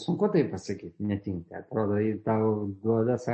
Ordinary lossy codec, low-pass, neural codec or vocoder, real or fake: MP3, 32 kbps; 10.8 kHz; none; real